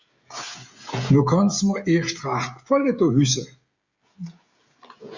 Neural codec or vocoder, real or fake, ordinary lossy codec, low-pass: codec, 16 kHz, 16 kbps, FreqCodec, smaller model; fake; Opus, 64 kbps; 7.2 kHz